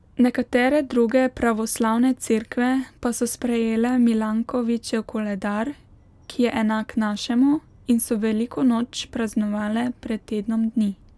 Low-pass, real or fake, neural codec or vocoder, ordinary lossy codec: none; real; none; none